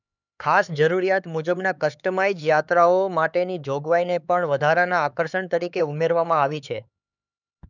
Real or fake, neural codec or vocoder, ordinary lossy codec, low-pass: fake; codec, 16 kHz, 4 kbps, X-Codec, HuBERT features, trained on LibriSpeech; none; 7.2 kHz